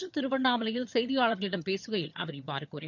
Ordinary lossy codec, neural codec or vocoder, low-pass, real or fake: none; vocoder, 22.05 kHz, 80 mel bands, HiFi-GAN; 7.2 kHz; fake